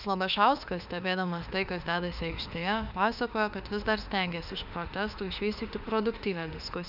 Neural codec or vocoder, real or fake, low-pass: autoencoder, 48 kHz, 32 numbers a frame, DAC-VAE, trained on Japanese speech; fake; 5.4 kHz